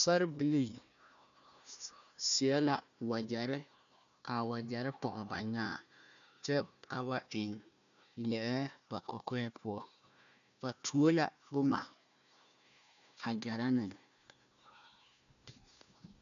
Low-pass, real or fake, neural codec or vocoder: 7.2 kHz; fake; codec, 16 kHz, 1 kbps, FunCodec, trained on Chinese and English, 50 frames a second